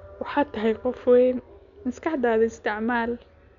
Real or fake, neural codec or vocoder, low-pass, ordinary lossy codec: real; none; 7.2 kHz; none